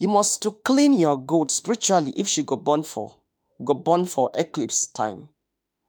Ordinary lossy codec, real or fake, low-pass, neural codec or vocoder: none; fake; none; autoencoder, 48 kHz, 32 numbers a frame, DAC-VAE, trained on Japanese speech